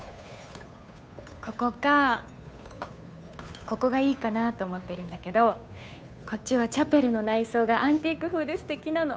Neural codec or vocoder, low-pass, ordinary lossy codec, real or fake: codec, 16 kHz, 2 kbps, FunCodec, trained on Chinese and English, 25 frames a second; none; none; fake